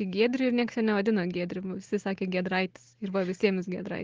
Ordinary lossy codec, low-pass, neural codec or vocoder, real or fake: Opus, 16 kbps; 7.2 kHz; none; real